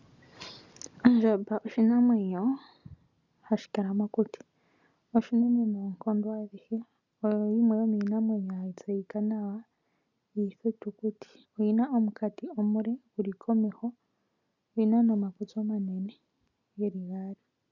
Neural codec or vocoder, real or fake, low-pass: none; real; 7.2 kHz